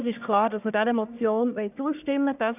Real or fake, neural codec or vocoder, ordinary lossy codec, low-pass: fake; codec, 44.1 kHz, 1.7 kbps, Pupu-Codec; none; 3.6 kHz